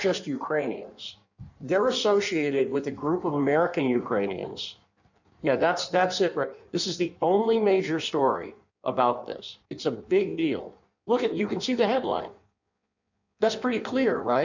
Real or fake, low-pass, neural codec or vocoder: fake; 7.2 kHz; codec, 16 kHz in and 24 kHz out, 1.1 kbps, FireRedTTS-2 codec